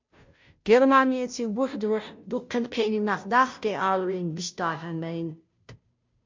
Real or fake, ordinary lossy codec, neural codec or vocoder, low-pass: fake; MP3, 64 kbps; codec, 16 kHz, 0.5 kbps, FunCodec, trained on Chinese and English, 25 frames a second; 7.2 kHz